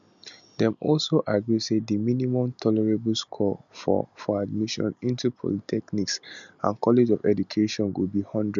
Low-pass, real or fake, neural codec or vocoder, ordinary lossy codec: 7.2 kHz; real; none; none